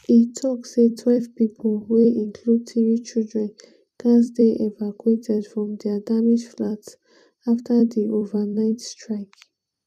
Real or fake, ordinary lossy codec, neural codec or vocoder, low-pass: fake; none; vocoder, 44.1 kHz, 128 mel bands every 256 samples, BigVGAN v2; 14.4 kHz